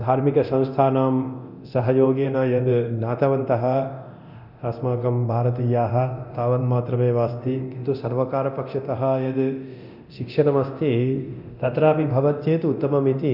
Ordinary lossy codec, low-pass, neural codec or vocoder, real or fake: none; 5.4 kHz; codec, 24 kHz, 0.9 kbps, DualCodec; fake